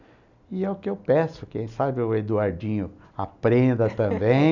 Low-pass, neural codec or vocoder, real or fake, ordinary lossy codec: 7.2 kHz; none; real; none